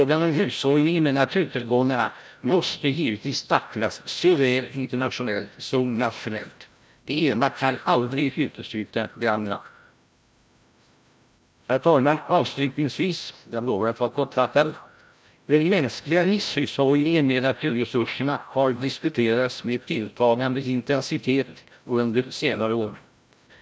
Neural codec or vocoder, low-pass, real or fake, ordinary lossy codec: codec, 16 kHz, 0.5 kbps, FreqCodec, larger model; none; fake; none